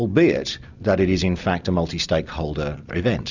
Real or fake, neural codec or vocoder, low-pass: real; none; 7.2 kHz